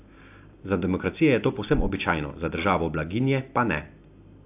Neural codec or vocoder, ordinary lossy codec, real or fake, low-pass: none; AAC, 32 kbps; real; 3.6 kHz